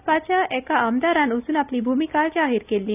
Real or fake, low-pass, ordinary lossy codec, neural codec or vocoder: real; 3.6 kHz; none; none